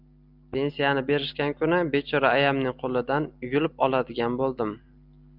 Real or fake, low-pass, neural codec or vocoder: real; 5.4 kHz; none